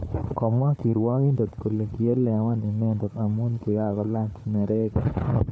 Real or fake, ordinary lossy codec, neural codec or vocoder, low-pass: fake; none; codec, 16 kHz, 4 kbps, FunCodec, trained on Chinese and English, 50 frames a second; none